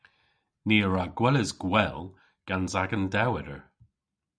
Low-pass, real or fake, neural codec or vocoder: 9.9 kHz; real; none